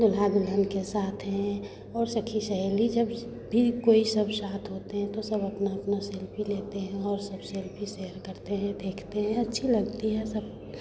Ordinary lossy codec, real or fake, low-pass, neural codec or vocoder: none; real; none; none